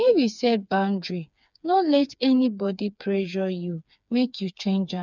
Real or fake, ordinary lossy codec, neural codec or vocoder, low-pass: fake; none; codec, 16 kHz, 4 kbps, FreqCodec, smaller model; 7.2 kHz